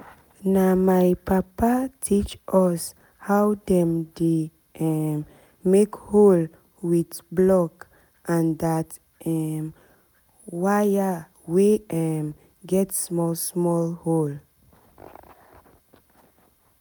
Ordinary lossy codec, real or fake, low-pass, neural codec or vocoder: none; real; none; none